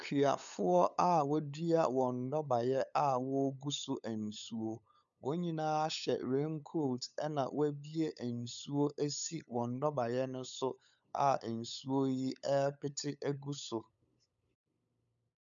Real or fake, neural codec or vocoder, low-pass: fake; codec, 16 kHz, 8 kbps, FunCodec, trained on LibriTTS, 25 frames a second; 7.2 kHz